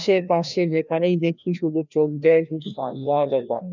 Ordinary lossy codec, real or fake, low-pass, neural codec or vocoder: none; fake; 7.2 kHz; codec, 16 kHz, 1 kbps, FreqCodec, larger model